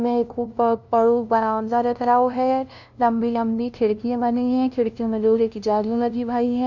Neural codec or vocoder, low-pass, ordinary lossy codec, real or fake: codec, 16 kHz, 0.5 kbps, FunCodec, trained on LibriTTS, 25 frames a second; 7.2 kHz; none; fake